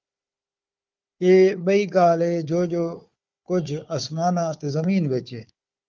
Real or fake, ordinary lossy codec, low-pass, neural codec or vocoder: fake; Opus, 32 kbps; 7.2 kHz; codec, 16 kHz, 4 kbps, FunCodec, trained on Chinese and English, 50 frames a second